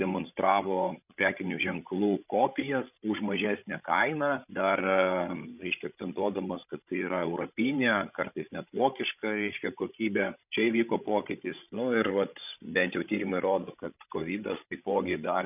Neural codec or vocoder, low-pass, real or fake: codec, 16 kHz, 16 kbps, FreqCodec, larger model; 3.6 kHz; fake